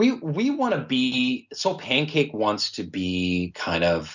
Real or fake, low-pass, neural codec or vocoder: real; 7.2 kHz; none